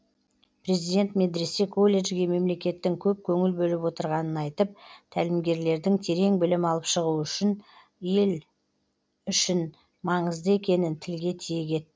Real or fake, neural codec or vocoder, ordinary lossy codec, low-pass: real; none; none; none